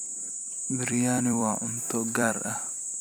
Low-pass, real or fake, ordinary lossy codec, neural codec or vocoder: none; fake; none; vocoder, 44.1 kHz, 128 mel bands every 256 samples, BigVGAN v2